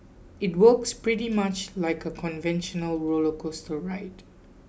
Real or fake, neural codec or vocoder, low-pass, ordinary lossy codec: real; none; none; none